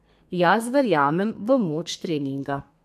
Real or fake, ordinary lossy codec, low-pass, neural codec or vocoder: fake; MP3, 96 kbps; 14.4 kHz; codec, 32 kHz, 1.9 kbps, SNAC